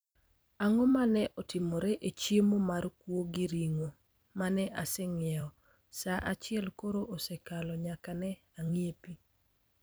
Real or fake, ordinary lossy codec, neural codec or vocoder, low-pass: fake; none; vocoder, 44.1 kHz, 128 mel bands every 256 samples, BigVGAN v2; none